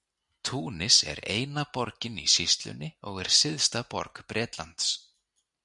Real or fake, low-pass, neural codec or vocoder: real; 10.8 kHz; none